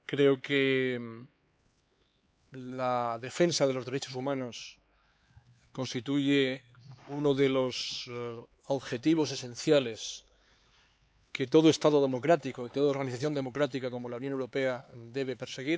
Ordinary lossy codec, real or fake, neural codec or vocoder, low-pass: none; fake; codec, 16 kHz, 4 kbps, X-Codec, HuBERT features, trained on LibriSpeech; none